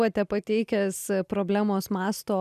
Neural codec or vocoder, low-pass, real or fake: none; 14.4 kHz; real